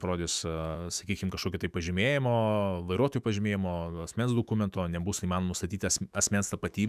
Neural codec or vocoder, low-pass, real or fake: autoencoder, 48 kHz, 128 numbers a frame, DAC-VAE, trained on Japanese speech; 14.4 kHz; fake